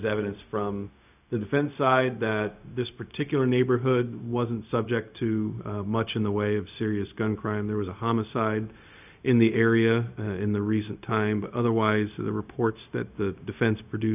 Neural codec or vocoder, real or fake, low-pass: codec, 16 kHz, 0.4 kbps, LongCat-Audio-Codec; fake; 3.6 kHz